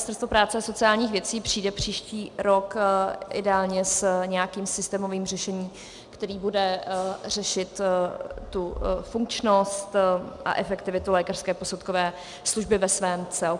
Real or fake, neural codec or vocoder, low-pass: real; none; 10.8 kHz